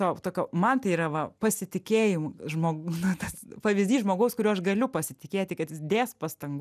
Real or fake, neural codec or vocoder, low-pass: real; none; 14.4 kHz